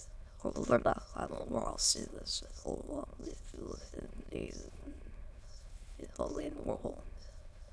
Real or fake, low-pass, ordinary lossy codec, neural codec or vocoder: fake; none; none; autoencoder, 22.05 kHz, a latent of 192 numbers a frame, VITS, trained on many speakers